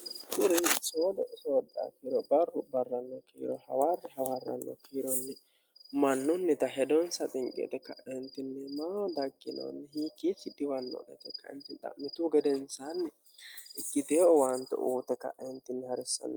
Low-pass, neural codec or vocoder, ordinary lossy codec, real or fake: 19.8 kHz; none; Opus, 32 kbps; real